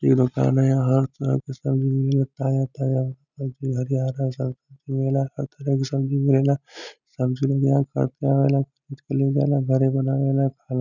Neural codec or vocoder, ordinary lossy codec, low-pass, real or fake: none; none; 7.2 kHz; real